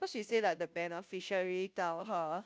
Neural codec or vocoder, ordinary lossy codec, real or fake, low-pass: codec, 16 kHz, 0.5 kbps, FunCodec, trained on Chinese and English, 25 frames a second; none; fake; none